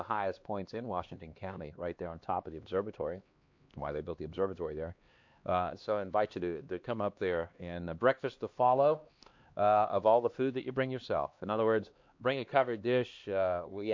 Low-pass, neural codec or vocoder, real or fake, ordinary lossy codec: 7.2 kHz; codec, 16 kHz, 2 kbps, X-Codec, HuBERT features, trained on LibriSpeech; fake; MP3, 48 kbps